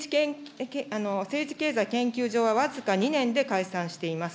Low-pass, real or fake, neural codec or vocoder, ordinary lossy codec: none; real; none; none